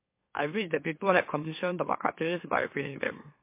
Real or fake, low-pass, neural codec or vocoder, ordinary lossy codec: fake; 3.6 kHz; autoencoder, 44.1 kHz, a latent of 192 numbers a frame, MeloTTS; MP3, 24 kbps